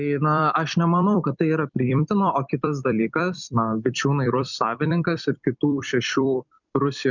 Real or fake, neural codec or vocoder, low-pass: fake; vocoder, 44.1 kHz, 128 mel bands every 256 samples, BigVGAN v2; 7.2 kHz